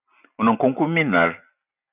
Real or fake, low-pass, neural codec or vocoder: real; 3.6 kHz; none